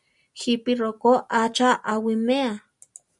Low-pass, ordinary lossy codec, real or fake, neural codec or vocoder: 10.8 kHz; MP3, 96 kbps; real; none